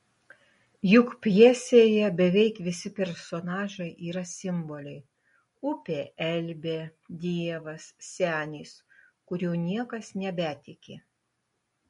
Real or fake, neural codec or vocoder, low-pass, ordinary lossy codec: real; none; 19.8 kHz; MP3, 48 kbps